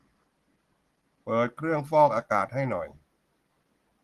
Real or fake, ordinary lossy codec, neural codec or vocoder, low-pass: real; Opus, 16 kbps; none; 14.4 kHz